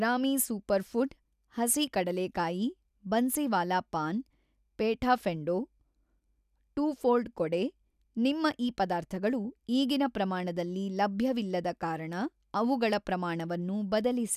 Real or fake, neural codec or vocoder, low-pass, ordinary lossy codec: real; none; 14.4 kHz; none